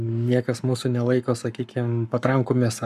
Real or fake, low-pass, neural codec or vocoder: fake; 14.4 kHz; codec, 44.1 kHz, 7.8 kbps, Pupu-Codec